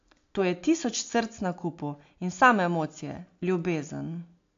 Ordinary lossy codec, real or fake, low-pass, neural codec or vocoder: AAC, 48 kbps; real; 7.2 kHz; none